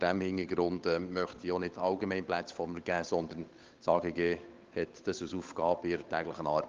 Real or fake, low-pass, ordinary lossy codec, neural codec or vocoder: fake; 7.2 kHz; Opus, 32 kbps; codec, 16 kHz, 8 kbps, FunCodec, trained on Chinese and English, 25 frames a second